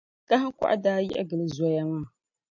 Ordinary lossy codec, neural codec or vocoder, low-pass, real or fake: MP3, 64 kbps; none; 7.2 kHz; real